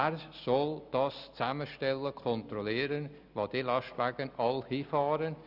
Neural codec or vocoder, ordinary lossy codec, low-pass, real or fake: none; none; 5.4 kHz; real